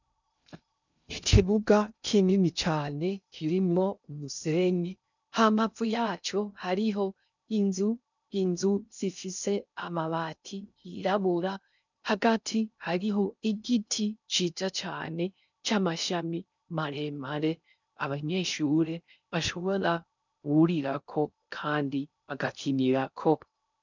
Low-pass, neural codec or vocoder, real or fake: 7.2 kHz; codec, 16 kHz in and 24 kHz out, 0.6 kbps, FocalCodec, streaming, 2048 codes; fake